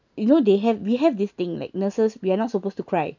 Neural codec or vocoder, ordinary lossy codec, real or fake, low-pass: none; none; real; 7.2 kHz